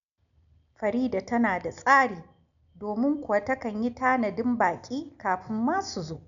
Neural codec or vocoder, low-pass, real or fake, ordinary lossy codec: none; 7.2 kHz; real; none